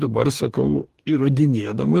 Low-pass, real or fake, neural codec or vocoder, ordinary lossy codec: 14.4 kHz; fake; codec, 44.1 kHz, 2.6 kbps, DAC; Opus, 24 kbps